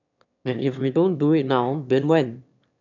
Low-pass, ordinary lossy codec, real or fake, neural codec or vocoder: 7.2 kHz; none; fake; autoencoder, 22.05 kHz, a latent of 192 numbers a frame, VITS, trained on one speaker